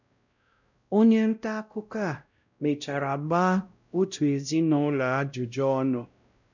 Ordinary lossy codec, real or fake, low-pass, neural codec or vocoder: none; fake; 7.2 kHz; codec, 16 kHz, 0.5 kbps, X-Codec, WavLM features, trained on Multilingual LibriSpeech